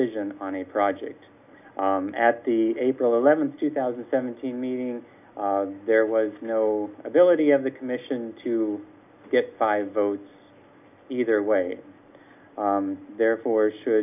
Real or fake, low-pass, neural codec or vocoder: real; 3.6 kHz; none